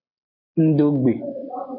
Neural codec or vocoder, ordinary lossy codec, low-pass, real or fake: none; MP3, 32 kbps; 5.4 kHz; real